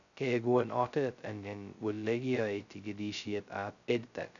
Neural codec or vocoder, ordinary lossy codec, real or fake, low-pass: codec, 16 kHz, 0.2 kbps, FocalCodec; none; fake; 7.2 kHz